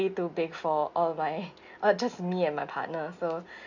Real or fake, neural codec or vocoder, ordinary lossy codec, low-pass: real; none; none; 7.2 kHz